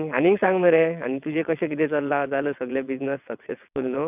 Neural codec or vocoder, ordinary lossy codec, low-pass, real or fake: vocoder, 22.05 kHz, 80 mel bands, WaveNeXt; none; 3.6 kHz; fake